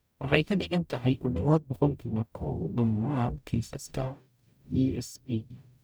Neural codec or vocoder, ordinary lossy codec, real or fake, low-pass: codec, 44.1 kHz, 0.9 kbps, DAC; none; fake; none